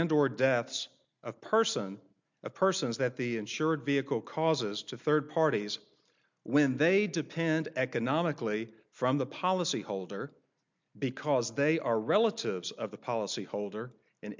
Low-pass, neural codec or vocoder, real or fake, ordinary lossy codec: 7.2 kHz; none; real; MP3, 64 kbps